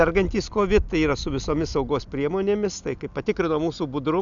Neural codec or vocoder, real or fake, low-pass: none; real; 7.2 kHz